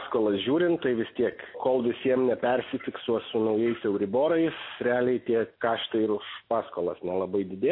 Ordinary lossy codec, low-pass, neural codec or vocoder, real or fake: MP3, 24 kbps; 5.4 kHz; none; real